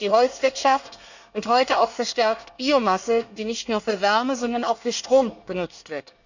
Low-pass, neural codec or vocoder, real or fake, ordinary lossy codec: 7.2 kHz; codec, 24 kHz, 1 kbps, SNAC; fake; none